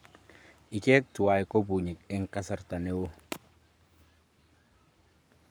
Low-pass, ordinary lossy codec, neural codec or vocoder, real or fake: none; none; codec, 44.1 kHz, 7.8 kbps, Pupu-Codec; fake